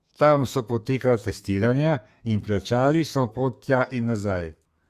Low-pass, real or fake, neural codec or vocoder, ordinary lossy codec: 14.4 kHz; fake; codec, 32 kHz, 1.9 kbps, SNAC; Opus, 64 kbps